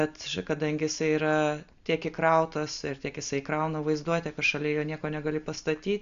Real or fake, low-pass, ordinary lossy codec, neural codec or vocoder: real; 7.2 kHz; Opus, 64 kbps; none